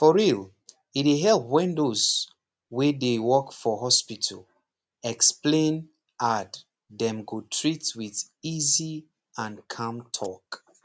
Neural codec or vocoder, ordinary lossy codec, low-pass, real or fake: none; none; none; real